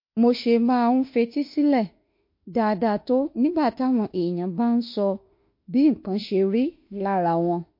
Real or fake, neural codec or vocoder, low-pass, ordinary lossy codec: fake; autoencoder, 48 kHz, 32 numbers a frame, DAC-VAE, trained on Japanese speech; 5.4 kHz; MP3, 32 kbps